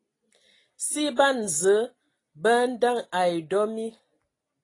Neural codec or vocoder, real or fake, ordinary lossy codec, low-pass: none; real; AAC, 32 kbps; 10.8 kHz